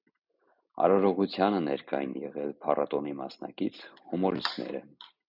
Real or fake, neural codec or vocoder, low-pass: real; none; 5.4 kHz